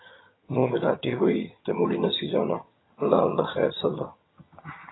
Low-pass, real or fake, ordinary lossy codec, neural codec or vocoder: 7.2 kHz; fake; AAC, 16 kbps; vocoder, 22.05 kHz, 80 mel bands, HiFi-GAN